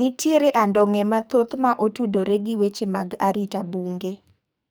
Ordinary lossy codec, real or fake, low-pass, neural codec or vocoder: none; fake; none; codec, 44.1 kHz, 2.6 kbps, SNAC